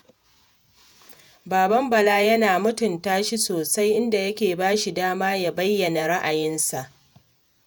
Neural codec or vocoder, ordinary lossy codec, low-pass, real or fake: vocoder, 48 kHz, 128 mel bands, Vocos; none; none; fake